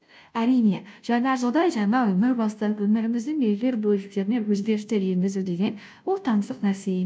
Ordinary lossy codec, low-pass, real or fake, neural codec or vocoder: none; none; fake; codec, 16 kHz, 0.5 kbps, FunCodec, trained on Chinese and English, 25 frames a second